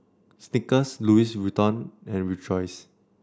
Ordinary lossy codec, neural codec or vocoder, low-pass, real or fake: none; none; none; real